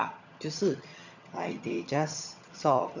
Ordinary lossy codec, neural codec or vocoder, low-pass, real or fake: none; vocoder, 22.05 kHz, 80 mel bands, HiFi-GAN; 7.2 kHz; fake